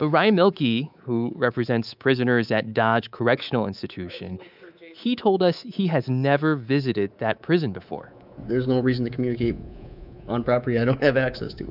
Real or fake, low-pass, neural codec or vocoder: fake; 5.4 kHz; codec, 24 kHz, 3.1 kbps, DualCodec